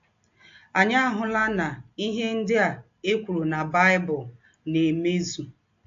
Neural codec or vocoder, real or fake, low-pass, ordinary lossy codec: none; real; 7.2 kHz; AAC, 48 kbps